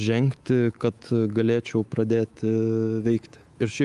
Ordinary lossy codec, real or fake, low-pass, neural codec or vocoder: Opus, 32 kbps; fake; 10.8 kHz; codec, 24 kHz, 3.1 kbps, DualCodec